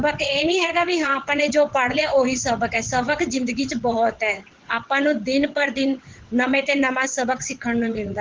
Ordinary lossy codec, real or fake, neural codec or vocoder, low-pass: Opus, 16 kbps; fake; vocoder, 22.05 kHz, 80 mel bands, WaveNeXt; 7.2 kHz